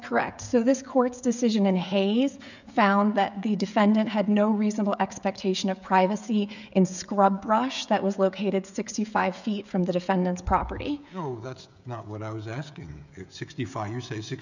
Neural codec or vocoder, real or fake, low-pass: codec, 16 kHz, 16 kbps, FreqCodec, smaller model; fake; 7.2 kHz